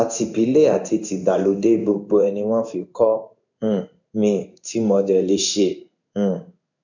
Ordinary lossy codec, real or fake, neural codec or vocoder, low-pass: none; fake; codec, 16 kHz in and 24 kHz out, 1 kbps, XY-Tokenizer; 7.2 kHz